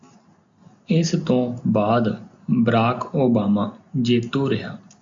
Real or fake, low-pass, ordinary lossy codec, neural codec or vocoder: real; 7.2 kHz; AAC, 64 kbps; none